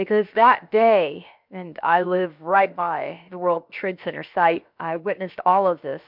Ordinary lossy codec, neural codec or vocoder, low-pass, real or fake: MP3, 48 kbps; codec, 16 kHz, about 1 kbps, DyCAST, with the encoder's durations; 5.4 kHz; fake